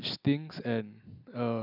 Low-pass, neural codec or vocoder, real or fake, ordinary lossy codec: 5.4 kHz; none; real; none